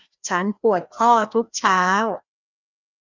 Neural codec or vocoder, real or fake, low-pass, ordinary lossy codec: codec, 16 kHz, 1 kbps, FreqCodec, larger model; fake; 7.2 kHz; none